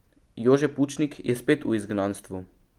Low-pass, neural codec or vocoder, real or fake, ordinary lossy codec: 19.8 kHz; none; real; Opus, 24 kbps